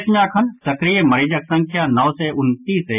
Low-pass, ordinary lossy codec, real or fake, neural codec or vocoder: 3.6 kHz; none; real; none